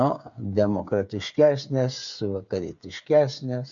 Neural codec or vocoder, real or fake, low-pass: codec, 16 kHz, 8 kbps, FreqCodec, smaller model; fake; 7.2 kHz